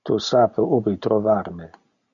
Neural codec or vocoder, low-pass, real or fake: none; 7.2 kHz; real